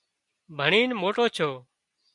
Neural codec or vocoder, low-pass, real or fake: none; 10.8 kHz; real